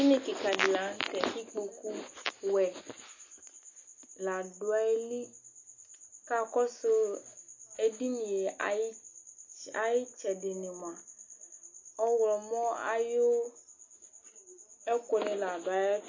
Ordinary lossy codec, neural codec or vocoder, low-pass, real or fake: MP3, 32 kbps; none; 7.2 kHz; real